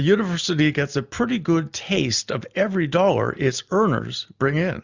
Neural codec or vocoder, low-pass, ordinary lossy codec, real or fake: none; 7.2 kHz; Opus, 64 kbps; real